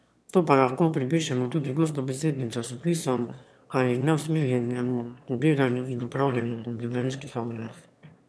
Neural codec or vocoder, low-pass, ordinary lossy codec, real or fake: autoencoder, 22.05 kHz, a latent of 192 numbers a frame, VITS, trained on one speaker; none; none; fake